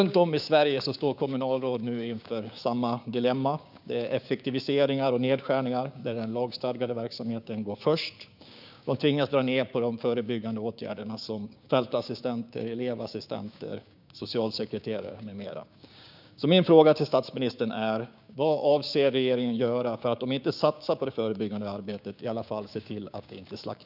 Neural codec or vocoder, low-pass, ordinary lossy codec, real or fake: codec, 16 kHz, 6 kbps, DAC; 5.4 kHz; none; fake